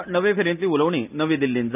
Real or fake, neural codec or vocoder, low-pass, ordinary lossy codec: real; none; 3.6 kHz; none